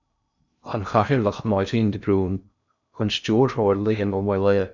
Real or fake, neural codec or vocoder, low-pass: fake; codec, 16 kHz in and 24 kHz out, 0.6 kbps, FocalCodec, streaming, 2048 codes; 7.2 kHz